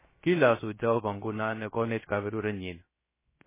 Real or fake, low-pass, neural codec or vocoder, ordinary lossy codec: fake; 3.6 kHz; codec, 16 kHz, 0.7 kbps, FocalCodec; MP3, 16 kbps